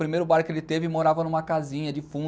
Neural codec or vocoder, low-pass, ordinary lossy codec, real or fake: none; none; none; real